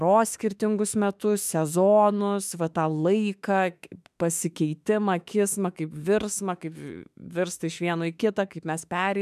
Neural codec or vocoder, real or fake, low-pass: autoencoder, 48 kHz, 32 numbers a frame, DAC-VAE, trained on Japanese speech; fake; 14.4 kHz